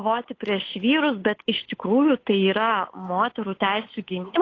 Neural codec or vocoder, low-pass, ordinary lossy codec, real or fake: codec, 16 kHz, 8 kbps, FunCodec, trained on Chinese and English, 25 frames a second; 7.2 kHz; AAC, 32 kbps; fake